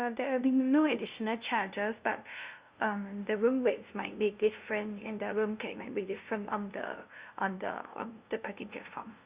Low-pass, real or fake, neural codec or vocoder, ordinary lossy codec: 3.6 kHz; fake; codec, 16 kHz, 0.5 kbps, FunCodec, trained on LibriTTS, 25 frames a second; Opus, 64 kbps